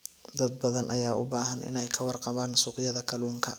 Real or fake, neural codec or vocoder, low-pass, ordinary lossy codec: fake; codec, 44.1 kHz, 7.8 kbps, DAC; none; none